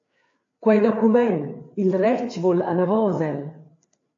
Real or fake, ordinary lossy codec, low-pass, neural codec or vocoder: fake; AAC, 48 kbps; 7.2 kHz; codec, 16 kHz, 4 kbps, FreqCodec, larger model